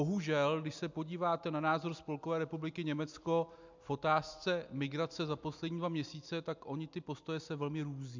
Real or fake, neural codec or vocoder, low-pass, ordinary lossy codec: real; none; 7.2 kHz; MP3, 64 kbps